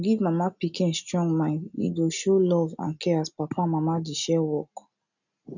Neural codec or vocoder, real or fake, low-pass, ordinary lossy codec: none; real; 7.2 kHz; none